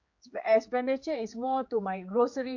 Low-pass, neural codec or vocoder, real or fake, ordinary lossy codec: 7.2 kHz; codec, 16 kHz, 4 kbps, X-Codec, HuBERT features, trained on general audio; fake; MP3, 48 kbps